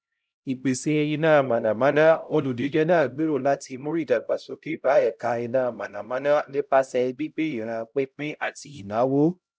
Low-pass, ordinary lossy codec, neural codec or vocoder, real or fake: none; none; codec, 16 kHz, 0.5 kbps, X-Codec, HuBERT features, trained on LibriSpeech; fake